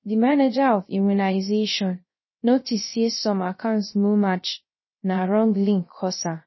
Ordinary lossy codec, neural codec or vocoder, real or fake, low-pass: MP3, 24 kbps; codec, 16 kHz, 0.3 kbps, FocalCodec; fake; 7.2 kHz